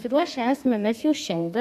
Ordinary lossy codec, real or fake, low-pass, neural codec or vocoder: AAC, 96 kbps; fake; 14.4 kHz; codec, 32 kHz, 1.9 kbps, SNAC